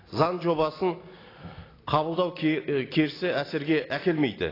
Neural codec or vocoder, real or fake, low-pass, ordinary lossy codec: none; real; 5.4 kHz; AAC, 24 kbps